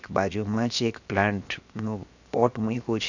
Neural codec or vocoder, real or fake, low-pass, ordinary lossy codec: codec, 16 kHz, 0.7 kbps, FocalCodec; fake; 7.2 kHz; none